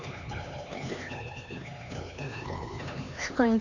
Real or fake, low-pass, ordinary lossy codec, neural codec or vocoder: fake; 7.2 kHz; none; codec, 16 kHz, 4 kbps, X-Codec, HuBERT features, trained on LibriSpeech